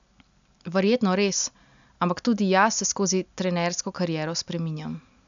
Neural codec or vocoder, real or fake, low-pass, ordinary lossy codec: none; real; 7.2 kHz; none